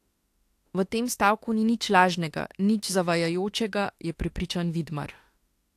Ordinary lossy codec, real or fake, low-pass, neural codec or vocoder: AAC, 64 kbps; fake; 14.4 kHz; autoencoder, 48 kHz, 32 numbers a frame, DAC-VAE, trained on Japanese speech